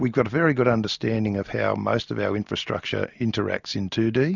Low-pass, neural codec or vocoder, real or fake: 7.2 kHz; none; real